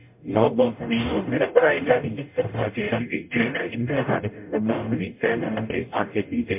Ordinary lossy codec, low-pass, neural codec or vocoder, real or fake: MP3, 32 kbps; 3.6 kHz; codec, 44.1 kHz, 0.9 kbps, DAC; fake